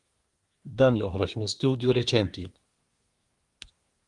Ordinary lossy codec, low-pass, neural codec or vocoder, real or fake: Opus, 32 kbps; 10.8 kHz; codec, 24 kHz, 1 kbps, SNAC; fake